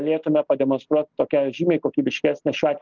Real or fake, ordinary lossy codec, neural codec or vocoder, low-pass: real; Opus, 24 kbps; none; 7.2 kHz